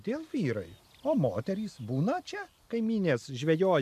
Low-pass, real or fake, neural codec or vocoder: 14.4 kHz; real; none